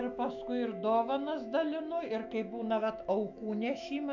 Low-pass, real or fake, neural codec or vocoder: 7.2 kHz; fake; autoencoder, 48 kHz, 128 numbers a frame, DAC-VAE, trained on Japanese speech